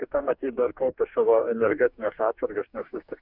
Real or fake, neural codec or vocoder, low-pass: fake; codec, 44.1 kHz, 2.6 kbps, DAC; 5.4 kHz